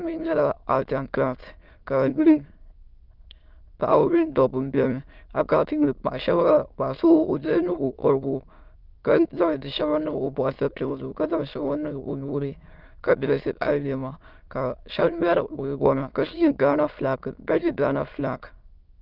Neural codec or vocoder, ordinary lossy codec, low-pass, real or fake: autoencoder, 22.05 kHz, a latent of 192 numbers a frame, VITS, trained on many speakers; Opus, 32 kbps; 5.4 kHz; fake